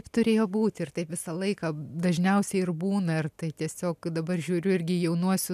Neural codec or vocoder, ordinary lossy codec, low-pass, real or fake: none; MP3, 96 kbps; 14.4 kHz; real